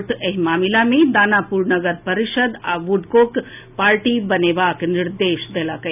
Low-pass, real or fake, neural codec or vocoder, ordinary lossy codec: 3.6 kHz; real; none; none